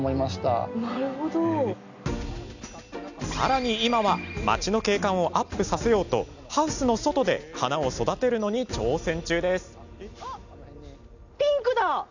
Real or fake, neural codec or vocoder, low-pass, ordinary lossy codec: real; none; 7.2 kHz; none